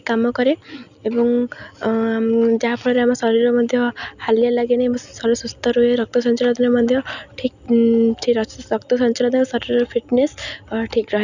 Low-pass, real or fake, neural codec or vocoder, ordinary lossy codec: 7.2 kHz; real; none; none